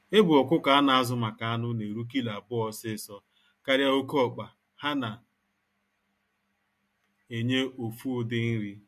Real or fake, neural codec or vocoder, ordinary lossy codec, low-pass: real; none; MP3, 64 kbps; 14.4 kHz